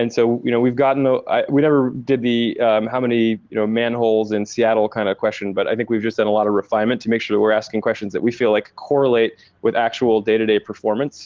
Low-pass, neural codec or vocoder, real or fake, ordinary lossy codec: 7.2 kHz; none; real; Opus, 16 kbps